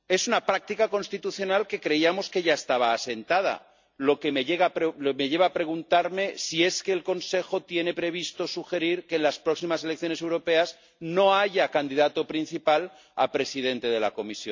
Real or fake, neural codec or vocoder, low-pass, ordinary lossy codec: real; none; 7.2 kHz; MP3, 64 kbps